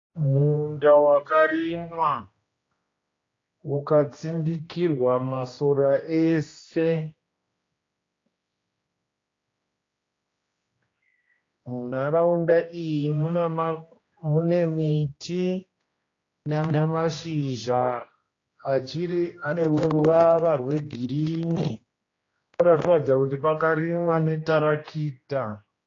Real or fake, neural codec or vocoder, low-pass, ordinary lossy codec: fake; codec, 16 kHz, 1 kbps, X-Codec, HuBERT features, trained on general audio; 7.2 kHz; AAC, 32 kbps